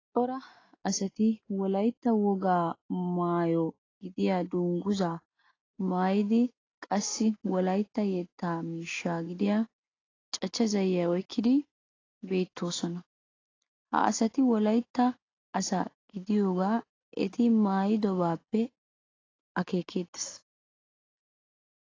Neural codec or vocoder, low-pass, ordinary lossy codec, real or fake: none; 7.2 kHz; AAC, 32 kbps; real